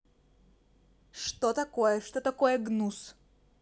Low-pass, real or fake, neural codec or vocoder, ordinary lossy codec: none; real; none; none